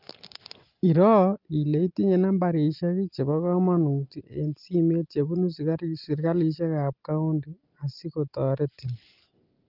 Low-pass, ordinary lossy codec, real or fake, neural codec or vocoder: 5.4 kHz; Opus, 24 kbps; real; none